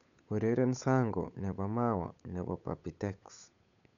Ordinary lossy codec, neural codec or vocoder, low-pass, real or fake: none; codec, 16 kHz, 8 kbps, FunCodec, trained on Chinese and English, 25 frames a second; 7.2 kHz; fake